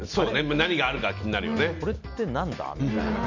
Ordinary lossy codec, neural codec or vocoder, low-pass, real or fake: none; none; 7.2 kHz; real